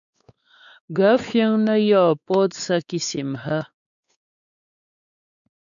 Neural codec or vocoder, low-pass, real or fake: codec, 16 kHz, 4 kbps, X-Codec, WavLM features, trained on Multilingual LibriSpeech; 7.2 kHz; fake